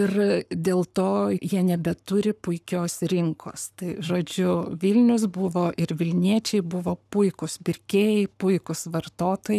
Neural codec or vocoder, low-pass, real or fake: codec, 44.1 kHz, 7.8 kbps, Pupu-Codec; 14.4 kHz; fake